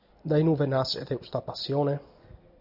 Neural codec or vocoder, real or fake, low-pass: none; real; 5.4 kHz